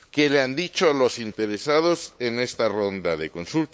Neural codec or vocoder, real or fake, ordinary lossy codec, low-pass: codec, 16 kHz, 8 kbps, FunCodec, trained on LibriTTS, 25 frames a second; fake; none; none